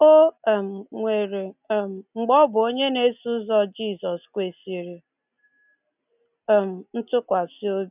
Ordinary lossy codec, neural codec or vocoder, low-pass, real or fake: none; none; 3.6 kHz; real